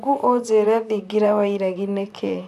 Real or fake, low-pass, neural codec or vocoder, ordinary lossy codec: fake; 14.4 kHz; vocoder, 44.1 kHz, 128 mel bands, Pupu-Vocoder; none